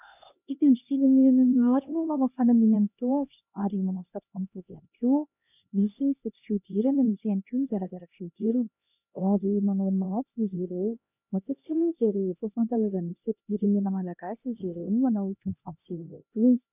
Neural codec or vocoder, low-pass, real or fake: codec, 16 kHz, 1 kbps, X-Codec, HuBERT features, trained on LibriSpeech; 3.6 kHz; fake